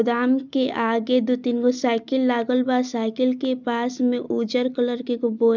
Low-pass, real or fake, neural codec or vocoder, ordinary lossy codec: 7.2 kHz; real; none; none